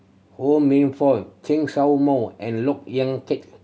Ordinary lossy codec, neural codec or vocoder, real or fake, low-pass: none; none; real; none